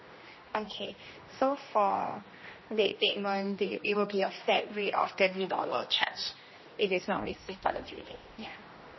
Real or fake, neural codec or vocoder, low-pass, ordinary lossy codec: fake; codec, 16 kHz, 1 kbps, X-Codec, HuBERT features, trained on general audio; 7.2 kHz; MP3, 24 kbps